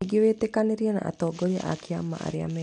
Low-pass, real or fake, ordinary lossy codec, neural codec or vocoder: 9.9 kHz; real; none; none